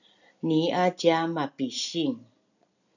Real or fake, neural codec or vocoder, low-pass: real; none; 7.2 kHz